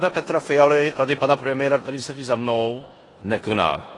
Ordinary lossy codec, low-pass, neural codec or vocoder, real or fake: AAC, 32 kbps; 10.8 kHz; codec, 16 kHz in and 24 kHz out, 0.9 kbps, LongCat-Audio-Codec, four codebook decoder; fake